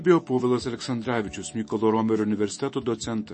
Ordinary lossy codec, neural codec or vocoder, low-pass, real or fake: MP3, 32 kbps; autoencoder, 48 kHz, 128 numbers a frame, DAC-VAE, trained on Japanese speech; 10.8 kHz; fake